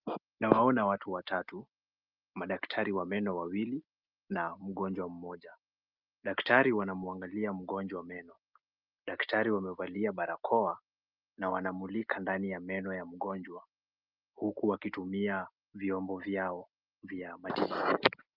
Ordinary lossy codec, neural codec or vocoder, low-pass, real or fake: Opus, 24 kbps; none; 5.4 kHz; real